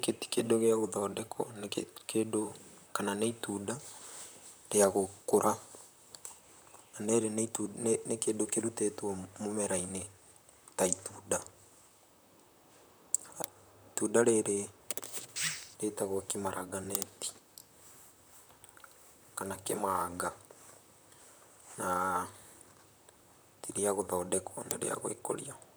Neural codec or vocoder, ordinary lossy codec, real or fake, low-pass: vocoder, 44.1 kHz, 128 mel bands, Pupu-Vocoder; none; fake; none